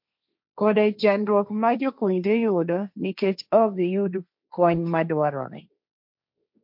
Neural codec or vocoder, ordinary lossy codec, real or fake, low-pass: codec, 16 kHz, 1.1 kbps, Voila-Tokenizer; MP3, 48 kbps; fake; 5.4 kHz